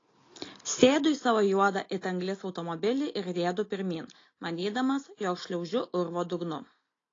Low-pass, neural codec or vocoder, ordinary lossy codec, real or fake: 7.2 kHz; none; AAC, 32 kbps; real